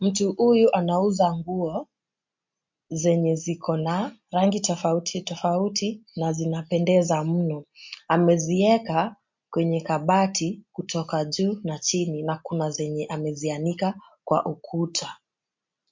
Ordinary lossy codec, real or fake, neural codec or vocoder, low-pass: MP3, 48 kbps; real; none; 7.2 kHz